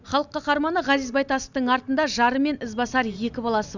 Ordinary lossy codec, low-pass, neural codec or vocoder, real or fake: none; 7.2 kHz; none; real